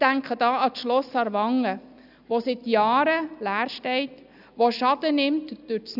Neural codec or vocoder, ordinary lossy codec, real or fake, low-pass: none; none; real; 5.4 kHz